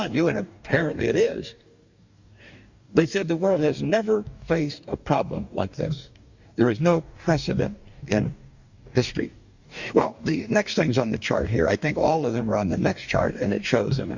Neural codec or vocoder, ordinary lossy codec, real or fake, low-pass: codec, 44.1 kHz, 2.6 kbps, DAC; Opus, 64 kbps; fake; 7.2 kHz